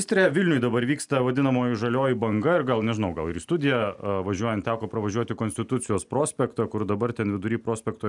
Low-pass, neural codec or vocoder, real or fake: 10.8 kHz; none; real